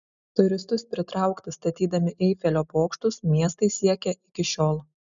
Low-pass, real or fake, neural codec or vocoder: 7.2 kHz; real; none